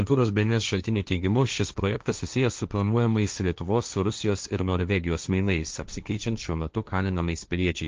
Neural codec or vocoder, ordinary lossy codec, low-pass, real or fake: codec, 16 kHz, 1.1 kbps, Voila-Tokenizer; Opus, 24 kbps; 7.2 kHz; fake